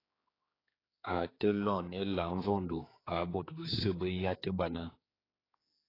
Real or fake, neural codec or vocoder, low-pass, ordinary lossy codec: fake; codec, 16 kHz, 4 kbps, X-Codec, HuBERT features, trained on general audio; 5.4 kHz; AAC, 24 kbps